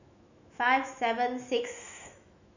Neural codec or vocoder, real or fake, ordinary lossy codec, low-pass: autoencoder, 48 kHz, 128 numbers a frame, DAC-VAE, trained on Japanese speech; fake; none; 7.2 kHz